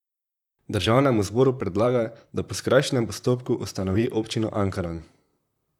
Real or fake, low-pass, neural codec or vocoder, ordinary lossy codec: fake; 19.8 kHz; vocoder, 44.1 kHz, 128 mel bands, Pupu-Vocoder; none